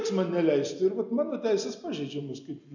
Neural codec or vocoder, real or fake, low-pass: none; real; 7.2 kHz